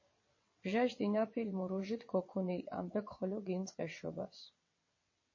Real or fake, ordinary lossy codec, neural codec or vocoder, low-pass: fake; MP3, 32 kbps; vocoder, 22.05 kHz, 80 mel bands, WaveNeXt; 7.2 kHz